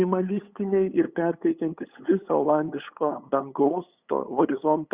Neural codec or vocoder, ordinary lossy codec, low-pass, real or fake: codec, 16 kHz, 8 kbps, FunCodec, trained on LibriTTS, 25 frames a second; Opus, 64 kbps; 3.6 kHz; fake